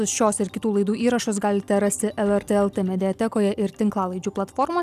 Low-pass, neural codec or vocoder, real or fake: 14.4 kHz; none; real